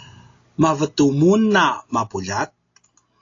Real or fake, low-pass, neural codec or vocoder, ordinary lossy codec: real; 7.2 kHz; none; AAC, 32 kbps